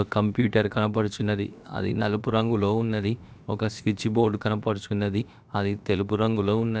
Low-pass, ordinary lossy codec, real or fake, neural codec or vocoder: none; none; fake; codec, 16 kHz, about 1 kbps, DyCAST, with the encoder's durations